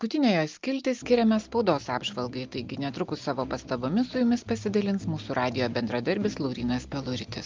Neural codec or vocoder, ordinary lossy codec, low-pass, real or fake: none; Opus, 32 kbps; 7.2 kHz; real